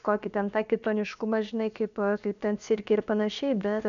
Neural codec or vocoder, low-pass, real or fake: codec, 16 kHz, about 1 kbps, DyCAST, with the encoder's durations; 7.2 kHz; fake